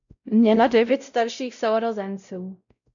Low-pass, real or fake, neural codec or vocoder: 7.2 kHz; fake; codec, 16 kHz, 0.5 kbps, X-Codec, WavLM features, trained on Multilingual LibriSpeech